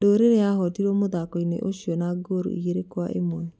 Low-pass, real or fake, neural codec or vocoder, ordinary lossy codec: none; real; none; none